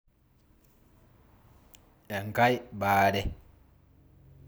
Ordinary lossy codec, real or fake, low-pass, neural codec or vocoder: none; real; none; none